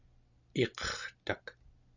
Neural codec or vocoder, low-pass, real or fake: none; 7.2 kHz; real